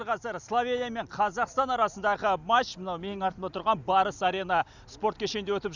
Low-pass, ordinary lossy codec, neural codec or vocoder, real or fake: 7.2 kHz; none; none; real